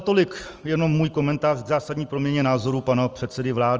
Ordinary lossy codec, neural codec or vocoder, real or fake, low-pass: Opus, 24 kbps; none; real; 7.2 kHz